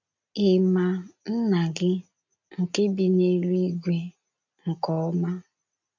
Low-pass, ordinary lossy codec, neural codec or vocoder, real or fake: 7.2 kHz; none; none; real